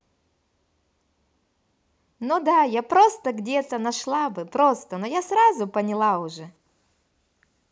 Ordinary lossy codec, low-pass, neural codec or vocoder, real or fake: none; none; none; real